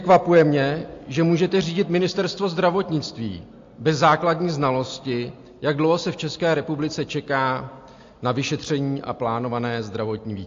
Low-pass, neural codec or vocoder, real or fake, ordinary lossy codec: 7.2 kHz; none; real; AAC, 48 kbps